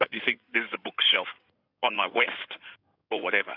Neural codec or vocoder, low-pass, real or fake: codec, 16 kHz in and 24 kHz out, 2.2 kbps, FireRedTTS-2 codec; 5.4 kHz; fake